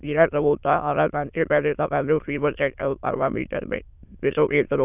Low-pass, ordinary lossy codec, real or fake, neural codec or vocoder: 3.6 kHz; none; fake; autoencoder, 22.05 kHz, a latent of 192 numbers a frame, VITS, trained on many speakers